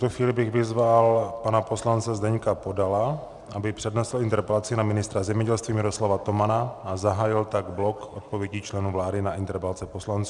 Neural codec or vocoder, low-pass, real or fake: none; 10.8 kHz; real